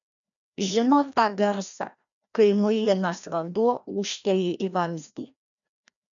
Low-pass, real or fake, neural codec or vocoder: 7.2 kHz; fake; codec, 16 kHz, 1 kbps, FreqCodec, larger model